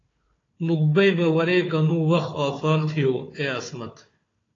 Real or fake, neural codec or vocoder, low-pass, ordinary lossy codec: fake; codec, 16 kHz, 4 kbps, FunCodec, trained on Chinese and English, 50 frames a second; 7.2 kHz; AAC, 32 kbps